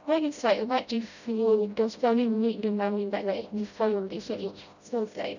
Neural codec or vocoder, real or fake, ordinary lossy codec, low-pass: codec, 16 kHz, 0.5 kbps, FreqCodec, smaller model; fake; none; 7.2 kHz